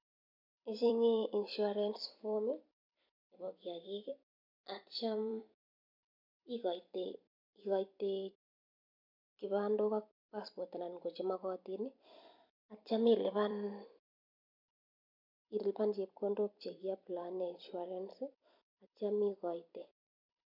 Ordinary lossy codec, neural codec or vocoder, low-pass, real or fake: MP3, 48 kbps; vocoder, 44.1 kHz, 128 mel bands every 256 samples, BigVGAN v2; 5.4 kHz; fake